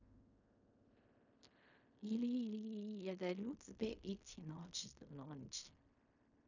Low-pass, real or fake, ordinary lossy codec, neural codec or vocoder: 7.2 kHz; fake; none; codec, 16 kHz in and 24 kHz out, 0.4 kbps, LongCat-Audio-Codec, fine tuned four codebook decoder